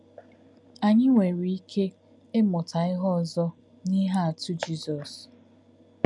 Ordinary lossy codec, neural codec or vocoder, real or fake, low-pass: none; none; real; 10.8 kHz